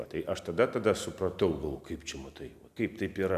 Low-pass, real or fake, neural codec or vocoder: 14.4 kHz; real; none